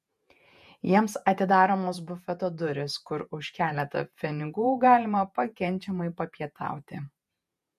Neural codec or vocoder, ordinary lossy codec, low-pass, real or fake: vocoder, 48 kHz, 128 mel bands, Vocos; MP3, 64 kbps; 14.4 kHz; fake